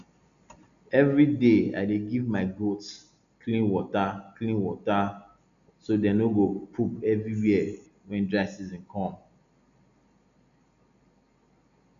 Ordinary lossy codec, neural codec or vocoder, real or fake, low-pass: none; none; real; 7.2 kHz